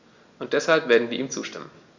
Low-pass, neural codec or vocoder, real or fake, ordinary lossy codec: 7.2 kHz; none; real; Opus, 64 kbps